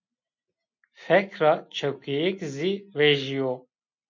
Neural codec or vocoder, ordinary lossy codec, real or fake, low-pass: none; MP3, 48 kbps; real; 7.2 kHz